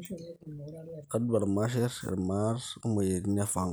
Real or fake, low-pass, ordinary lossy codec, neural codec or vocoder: real; none; none; none